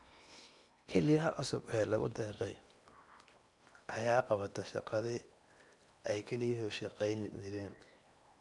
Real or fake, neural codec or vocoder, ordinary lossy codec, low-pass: fake; codec, 16 kHz in and 24 kHz out, 0.8 kbps, FocalCodec, streaming, 65536 codes; none; 10.8 kHz